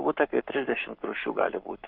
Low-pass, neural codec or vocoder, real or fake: 5.4 kHz; codec, 44.1 kHz, 7.8 kbps, Pupu-Codec; fake